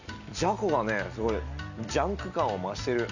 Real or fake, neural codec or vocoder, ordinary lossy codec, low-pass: real; none; none; 7.2 kHz